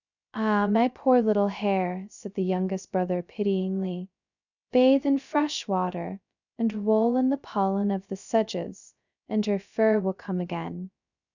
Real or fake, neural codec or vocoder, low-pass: fake; codec, 16 kHz, 0.2 kbps, FocalCodec; 7.2 kHz